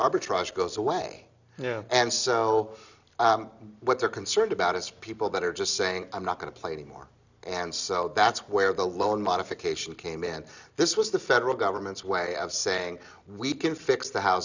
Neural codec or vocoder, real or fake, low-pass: none; real; 7.2 kHz